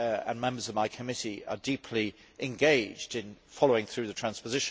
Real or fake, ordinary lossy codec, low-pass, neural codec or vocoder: real; none; none; none